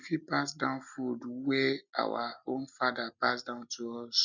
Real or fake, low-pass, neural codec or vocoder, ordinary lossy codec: real; 7.2 kHz; none; none